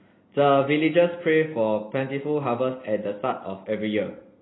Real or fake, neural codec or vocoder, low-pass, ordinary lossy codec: real; none; 7.2 kHz; AAC, 16 kbps